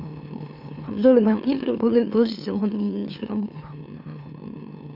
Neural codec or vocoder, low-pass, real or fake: autoencoder, 44.1 kHz, a latent of 192 numbers a frame, MeloTTS; 5.4 kHz; fake